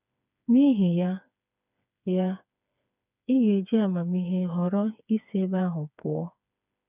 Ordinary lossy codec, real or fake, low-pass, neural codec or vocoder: none; fake; 3.6 kHz; codec, 16 kHz, 4 kbps, FreqCodec, smaller model